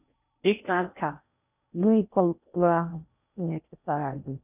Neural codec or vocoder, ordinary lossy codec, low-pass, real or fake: codec, 16 kHz in and 24 kHz out, 0.6 kbps, FocalCodec, streaming, 4096 codes; none; 3.6 kHz; fake